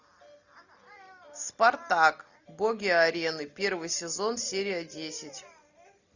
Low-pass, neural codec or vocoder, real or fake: 7.2 kHz; none; real